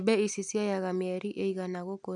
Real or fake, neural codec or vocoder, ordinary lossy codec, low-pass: real; none; none; 10.8 kHz